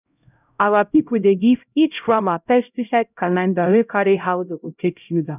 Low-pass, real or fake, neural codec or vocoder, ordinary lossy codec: 3.6 kHz; fake; codec, 16 kHz, 0.5 kbps, X-Codec, HuBERT features, trained on LibriSpeech; none